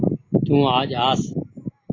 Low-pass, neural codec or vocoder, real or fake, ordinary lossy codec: 7.2 kHz; none; real; AAC, 32 kbps